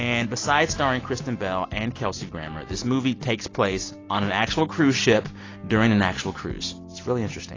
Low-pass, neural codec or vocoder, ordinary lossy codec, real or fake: 7.2 kHz; none; AAC, 32 kbps; real